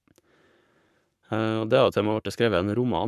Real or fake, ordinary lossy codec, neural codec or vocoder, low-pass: fake; none; codec, 44.1 kHz, 7.8 kbps, Pupu-Codec; 14.4 kHz